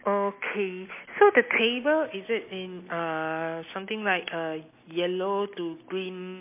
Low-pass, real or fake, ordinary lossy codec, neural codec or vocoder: 3.6 kHz; real; MP3, 24 kbps; none